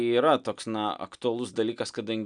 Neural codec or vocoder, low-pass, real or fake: none; 9.9 kHz; real